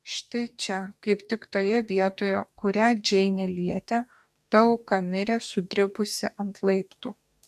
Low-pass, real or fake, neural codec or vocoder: 14.4 kHz; fake; codec, 44.1 kHz, 2.6 kbps, DAC